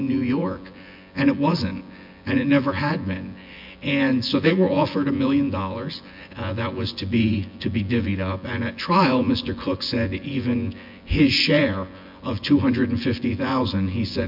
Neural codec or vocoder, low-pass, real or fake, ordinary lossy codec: vocoder, 24 kHz, 100 mel bands, Vocos; 5.4 kHz; fake; MP3, 48 kbps